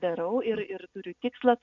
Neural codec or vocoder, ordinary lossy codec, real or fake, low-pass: none; MP3, 64 kbps; real; 7.2 kHz